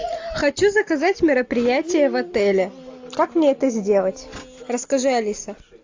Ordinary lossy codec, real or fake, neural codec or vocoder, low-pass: AAC, 48 kbps; real; none; 7.2 kHz